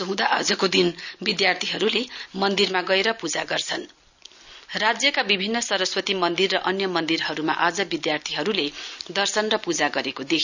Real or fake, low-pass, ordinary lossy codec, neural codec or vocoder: real; 7.2 kHz; none; none